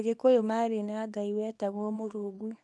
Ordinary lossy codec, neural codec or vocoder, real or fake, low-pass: none; codec, 24 kHz, 0.9 kbps, WavTokenizer, small release; fake; none